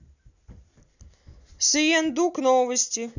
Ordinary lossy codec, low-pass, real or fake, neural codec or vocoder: none; 7.2 kHz; real; none